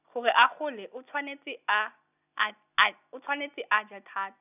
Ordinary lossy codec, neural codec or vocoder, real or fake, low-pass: none; none; real; 3.6 kHz